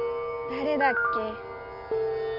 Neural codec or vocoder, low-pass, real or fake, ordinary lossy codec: none; 5.4 kHz; real; none